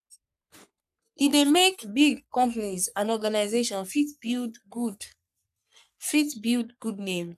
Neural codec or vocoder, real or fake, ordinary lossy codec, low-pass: codec, 44.1 kHz, 3.4 kbps, Pupu-Codec; fake; none; 14.4 kHz